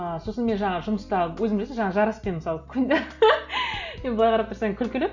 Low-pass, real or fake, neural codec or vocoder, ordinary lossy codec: 7.2 kHz; real; none; none